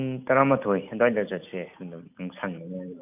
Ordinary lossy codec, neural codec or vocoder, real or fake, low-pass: none; none; real; 3.6 kHz